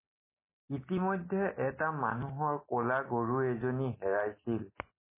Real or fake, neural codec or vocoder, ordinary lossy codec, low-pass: real; none; MP3, 16 kbps; 3.6 kHz